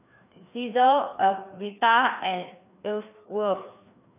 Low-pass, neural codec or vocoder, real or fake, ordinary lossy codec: 3.6 kHz; codec, 16 kHz, 2 kbps, FreqCodec, larger model; fake; none